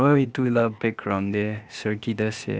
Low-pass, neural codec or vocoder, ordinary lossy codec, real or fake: none; codec, 16 kHz, 0.8 kbps, ZipCodec; none; fake